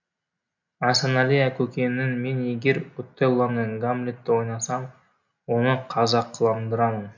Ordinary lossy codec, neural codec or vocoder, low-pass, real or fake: none; none; 7.2 kHz; real